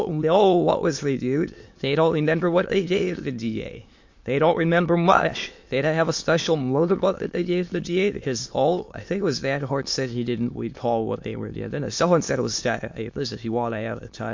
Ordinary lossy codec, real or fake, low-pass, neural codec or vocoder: MP3, 48 kbps; fake; 7.2 kHz; autoencoder, 22.05 kHz, a latent of 192 numbers a frame, VITS, trained on many speakers